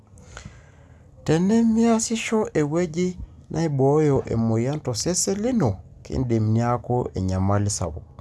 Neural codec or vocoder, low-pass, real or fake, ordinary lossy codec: none; none; real; none